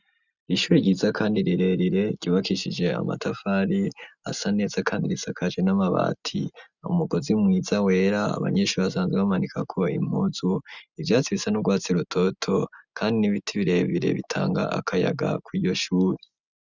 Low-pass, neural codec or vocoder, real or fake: 7.2 kHz; none; real